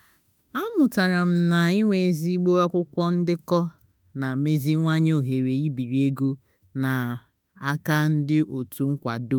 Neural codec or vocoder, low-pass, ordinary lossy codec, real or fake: autoencoder, 48 kHz, 32 numbers a frame, DAC-VAE, trained on Japanese speech; none; none; fake